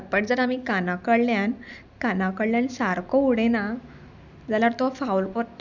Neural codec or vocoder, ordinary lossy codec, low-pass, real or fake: none; none; 7.2 kHz; real